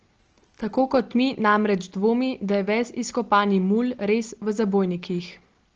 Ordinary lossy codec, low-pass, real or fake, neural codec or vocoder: Opus, 16 kbps; 7.2 kHz; real; none